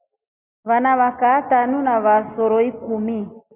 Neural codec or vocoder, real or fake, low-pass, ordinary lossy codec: none; real; 3.6 kHz; Opus, 64 kbps